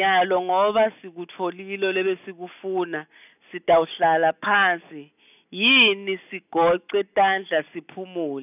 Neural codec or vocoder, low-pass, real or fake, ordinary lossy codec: none; 3.6 kHz; real; none